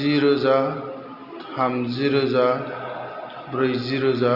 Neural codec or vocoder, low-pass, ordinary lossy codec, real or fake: none; 5.4 kHz; Opus, 64 kbps; real